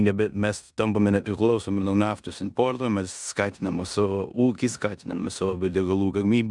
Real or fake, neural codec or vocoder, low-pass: fake; codec, 16 kHz in and 24 kHz out, 0.9 kbps, LongCat-Audio-Codec, four codebook decoder; 10.8 kHz